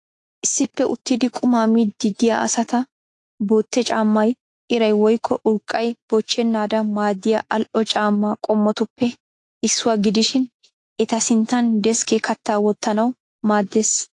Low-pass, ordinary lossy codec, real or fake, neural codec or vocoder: 10.8 kHz; AAC, 48 kbps; fake; autoencoder, 48 kHz, 128 numbers a frame, DAC-VAE, trained on Japanese speech